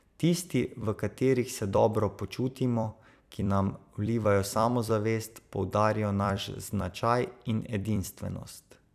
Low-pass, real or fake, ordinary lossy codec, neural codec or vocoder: 14.4 kHz; fake; none; vocoder, 44.1 kHz, 128 mel bands every 256 samples, BigVGAN v2